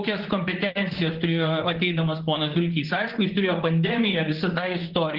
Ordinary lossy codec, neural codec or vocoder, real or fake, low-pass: Opus, 16 kbps; vocoder, 44.1 kHz, 128 mel bands, Pupu-Vocoder; fake; 5.4 kHz